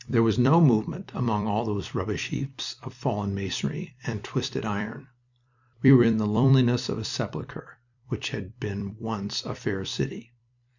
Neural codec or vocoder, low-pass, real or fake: vocoder, 44.1 kHz, 128 mel bands every 256 samples, BigVGAN v2; 7.2 kHz; fake